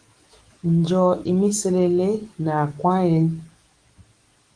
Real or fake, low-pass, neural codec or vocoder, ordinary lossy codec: fake; 9.9 kHz; autoencoder, 48 kHz, 128 numbers a frame, DAC-VAE, trained on Japanese speech; Opus, 24 kbps